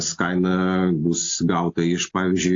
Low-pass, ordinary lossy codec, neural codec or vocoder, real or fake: 7.2 kHz; AAC, 48 kbps; none; real